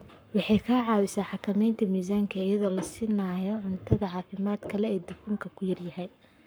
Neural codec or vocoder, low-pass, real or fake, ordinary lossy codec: codec, 44.1 kHz, 7.8 kbps, Pupu-Codec; none; fake; none